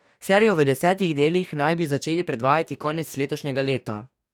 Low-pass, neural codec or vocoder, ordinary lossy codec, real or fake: 19.8 kHz; codec, 44.1 kHz, 2.6 kbps, DAC; none; fake